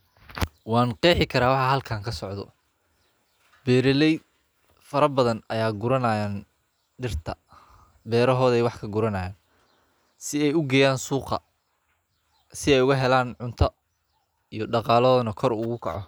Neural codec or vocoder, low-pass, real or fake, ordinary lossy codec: none; none; real; none